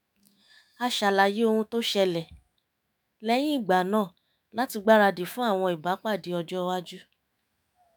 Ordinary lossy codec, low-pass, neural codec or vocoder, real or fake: none; none; autoencoder, 48 kHz, 128 numbers a frame, DAC-VAE, trained on Japanese speech; fake